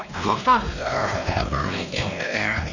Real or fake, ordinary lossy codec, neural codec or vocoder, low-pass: fake; none; codec, 16 kHz, 1 kbps, X-Codec, WavLM features, trained on Multilingual LibriSpeech; 7.2 kHz